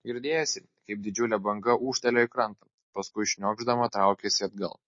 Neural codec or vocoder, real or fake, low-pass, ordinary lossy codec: none; real; 7.2 kHz; MP3, 32 kbps